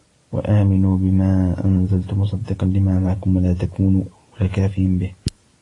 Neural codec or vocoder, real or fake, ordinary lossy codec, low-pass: none; real; AAC, 32 kbps; 10.8 kHz